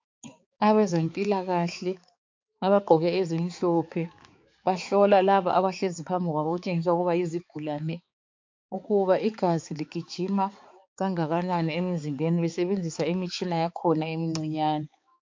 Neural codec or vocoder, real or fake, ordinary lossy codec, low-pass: codec, 16 kHz, 4 kbps, X-Codec, HuBERT features, trained on balanced general audio; fake; MP3, 48 kbps; 7.2 kHz